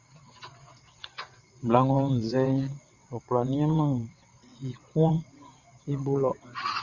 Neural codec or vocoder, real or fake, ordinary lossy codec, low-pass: vocoder, 22.05 kHz, 80 mel bands, WaveNeXt; fake; Opus, 64 kbps; 7.2 kHz